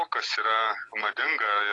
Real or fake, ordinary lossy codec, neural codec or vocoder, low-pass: real; AAC, 32 kbps; none; 10.8 kHz